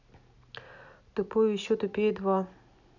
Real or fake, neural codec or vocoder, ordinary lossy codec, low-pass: real; none; none; 7.2 kHz